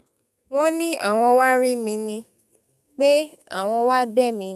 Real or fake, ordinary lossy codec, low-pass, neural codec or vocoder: fake; none; 14.4 kHz; codec, 32 kHz, 1.9 kbps, SNAC